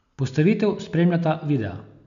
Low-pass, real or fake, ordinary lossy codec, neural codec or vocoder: 7.2 kHz; real; none; none